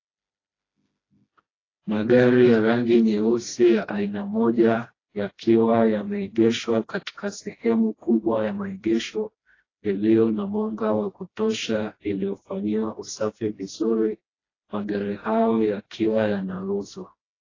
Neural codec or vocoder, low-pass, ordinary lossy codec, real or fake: codec, 16 kHz, 1 kbps, FreqCodec, smaller model; 7.2 kHz; AAC, 32 kbps; fake